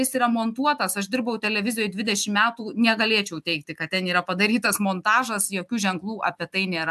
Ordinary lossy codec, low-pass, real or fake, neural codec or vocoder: AAC, 96 kbps; 14.4 kHz; real; none